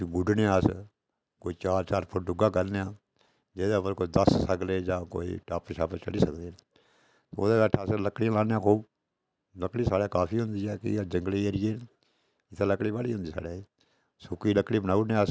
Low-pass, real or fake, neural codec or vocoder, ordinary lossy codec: none; real; none; none